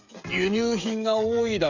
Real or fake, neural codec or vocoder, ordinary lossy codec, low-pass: fake; codec, 16 kHz, 16 kbps, FreqCodec, smaller model; Opus, 64 kbps; 7.2 kHz